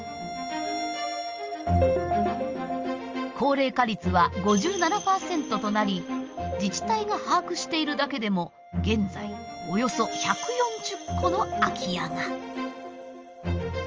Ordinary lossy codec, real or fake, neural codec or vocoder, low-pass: Opus, 24 kbps; real; none; 7.2 kHz